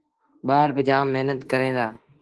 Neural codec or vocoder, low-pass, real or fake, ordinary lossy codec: autoencoder, 48 kHz, 32 numbers a frame, DAC-VAE, trained on Japanese speech; 10.8 kHz; fake; Opus, 16 kbps